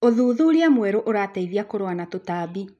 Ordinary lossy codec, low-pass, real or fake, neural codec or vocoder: none; none; real; none